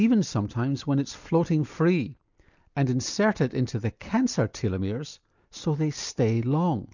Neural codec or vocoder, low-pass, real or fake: none; 7.2 kHz; real